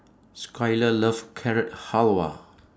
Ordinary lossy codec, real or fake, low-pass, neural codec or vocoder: none; real; none; none